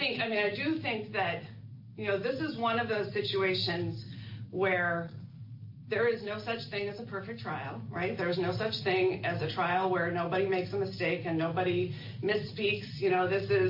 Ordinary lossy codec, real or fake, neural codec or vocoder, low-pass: MP3, 32 kbps; real; none; 5.4 kHz